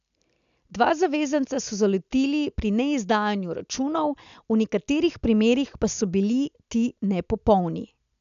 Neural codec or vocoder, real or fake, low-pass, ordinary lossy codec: none; real; 7.2 kHz; none